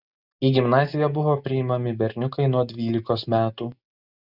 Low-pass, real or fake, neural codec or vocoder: 5.4 kHz; real; none